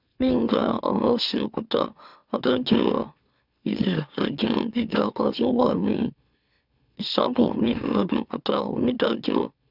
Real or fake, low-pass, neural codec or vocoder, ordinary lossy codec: fake; 5.4 kHz; autoencoder, 44.1 kHz, a latent of 192 numbers a frame, MeloTTS; none